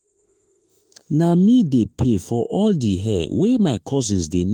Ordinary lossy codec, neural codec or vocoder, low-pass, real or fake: Opus, 24 kbps; autoencoder, 48 kHz, 32 numbers a frame, DAC-VAE, trained on Japanese speech; 19.8 kHz; fake